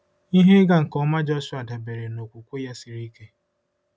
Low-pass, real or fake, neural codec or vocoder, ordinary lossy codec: none; real; none; none